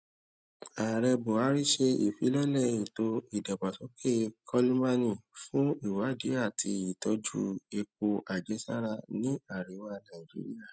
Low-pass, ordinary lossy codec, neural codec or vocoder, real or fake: none; none; none; real